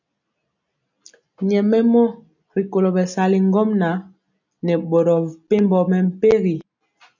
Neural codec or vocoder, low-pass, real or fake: none; 7.2 kHz; real